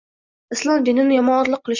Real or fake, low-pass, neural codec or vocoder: real; 7.2 kHz; none